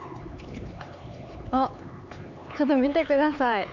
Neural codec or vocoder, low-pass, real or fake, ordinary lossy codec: codec, 16 kHz, 4 kbps, X-Codec, HuBERT features, trained on LibriSpeech; 7.2 kHz; fake; none